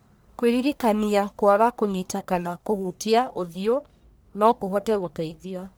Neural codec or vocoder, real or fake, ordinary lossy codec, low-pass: codec, 44.1 kHz, 1.7 kbps, Pupu-Codec; fake; none; none